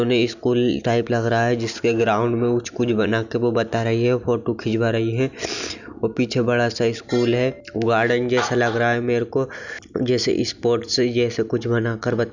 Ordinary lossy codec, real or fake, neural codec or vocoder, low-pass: none; real; none; 7.2 kHz